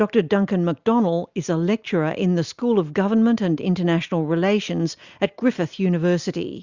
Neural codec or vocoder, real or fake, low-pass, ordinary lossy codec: none; real; 7.2 kHz; Opus, 64 kbps